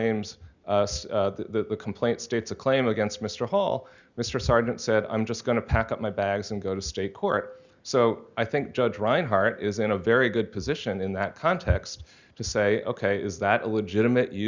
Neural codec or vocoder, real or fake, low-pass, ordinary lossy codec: none; real; 7.2 kHz; Opus, 64 kbps